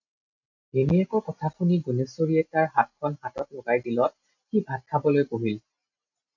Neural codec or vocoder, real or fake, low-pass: none; real; 7.2 kHz